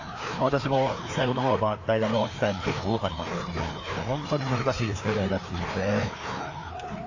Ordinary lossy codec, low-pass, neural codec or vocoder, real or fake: AAC, 48 kbps; 7.2 kHz; codec, 16 kHz, 2 kbps, FreqCodec, larger model; fake